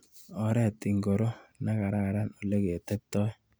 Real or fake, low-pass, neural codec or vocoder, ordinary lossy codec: real; none; none; none